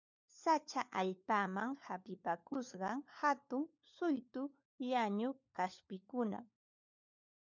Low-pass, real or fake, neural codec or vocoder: 7.2 kHz; fake; codec, 16 kHz, 4 kbps, FunCodec, trained on LibriTTS, 50 frames a second